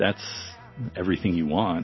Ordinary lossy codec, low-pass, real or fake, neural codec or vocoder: MP3, 24 kbps; 7.2 kHz; real; none